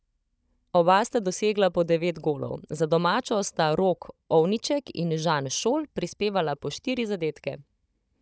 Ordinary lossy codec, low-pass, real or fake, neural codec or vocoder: none; none; fake; codec, 16 kHz, 16 kbps, FunCodec, trained on Chinese and English, 50 frames a second